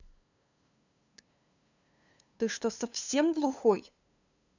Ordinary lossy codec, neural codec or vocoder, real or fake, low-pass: none; codec, 16 kHz, 2 kbps, FunCodec, trained on LibriTTS, 25 frames a second; fake; 7.2 kHz